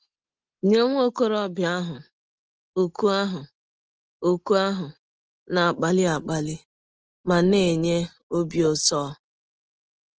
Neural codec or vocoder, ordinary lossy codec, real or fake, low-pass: none; Opus, 16 kbps; real; 7.2 kHz